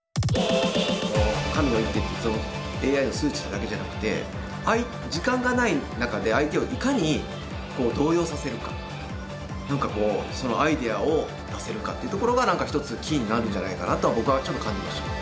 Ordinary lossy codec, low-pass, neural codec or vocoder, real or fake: none; none; none; real